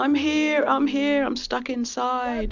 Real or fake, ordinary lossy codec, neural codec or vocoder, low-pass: real; MP3, 64 kbps; none; 7.2 kHz